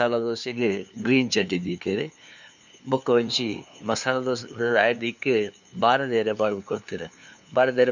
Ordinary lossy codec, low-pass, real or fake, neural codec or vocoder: none; 7.2 kHz; fake; codec, 16 kHz, 2 kbps, FunCodec, trained on LibriTTS, 25 frames a second